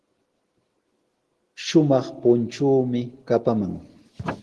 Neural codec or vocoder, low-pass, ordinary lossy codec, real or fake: none; 10.8 kHz; Opus, 16 kbps; real